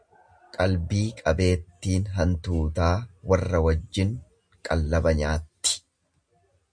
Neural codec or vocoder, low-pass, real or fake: none; 9.9 kHz; real